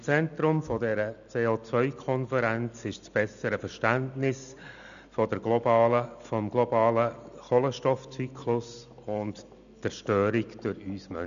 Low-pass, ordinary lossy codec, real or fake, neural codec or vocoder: 7.2 kHz; none; real; none